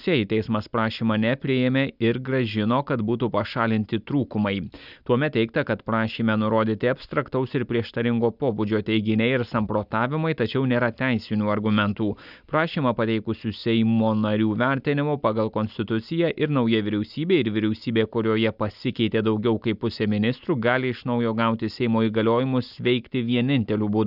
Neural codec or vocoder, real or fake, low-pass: none; real; 5.4 kHz